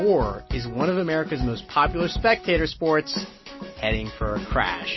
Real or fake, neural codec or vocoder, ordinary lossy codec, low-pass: real; none; MP3, 24 kbps; 7.2 kHz